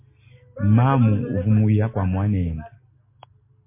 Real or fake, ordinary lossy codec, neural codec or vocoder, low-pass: real; MP3, 24 kbps; none; 3.6 kHz